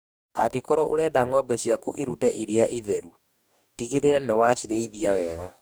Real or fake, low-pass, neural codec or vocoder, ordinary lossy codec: fake; none; codec, 44.1 kHz, 2.6 kbps, DAC; none